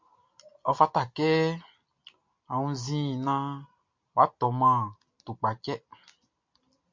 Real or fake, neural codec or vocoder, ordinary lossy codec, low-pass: real; none; MP3, 48 kbps; 7.2 kHz